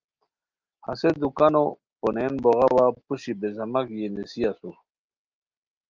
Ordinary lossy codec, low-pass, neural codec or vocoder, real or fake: Opus, 24 kbps; 7.2 kHz; none; real